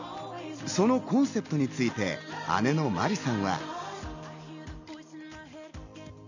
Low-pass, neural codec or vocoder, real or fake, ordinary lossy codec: 7.2 kHz; none; real; none